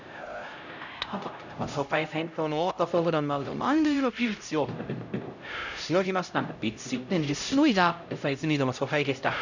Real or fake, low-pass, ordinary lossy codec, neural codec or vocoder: fake; 7.2 kHz; none; codec, 16 kHz, 0.5 kbps, X-Codec, HuBERT features, trained on LibriSpeech